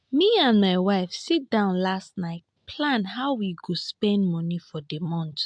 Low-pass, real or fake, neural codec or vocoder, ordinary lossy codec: 9.9 kHz; real; none; MP3, 64 kbps